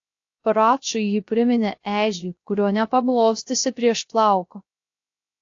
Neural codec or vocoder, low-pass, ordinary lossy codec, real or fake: codec, 16 kHz, 0.3 kbps, FocalCodec; 7.2 kHz; AAC, 48 kbps; fake